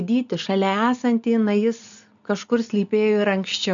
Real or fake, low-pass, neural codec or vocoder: real; 7.2 kHz; none